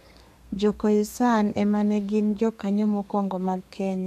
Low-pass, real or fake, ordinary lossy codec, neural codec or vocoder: 14.4 kHz; fake; MP3, 96 kbps; codec, 32 kHz, 1.9 kbps, SNAC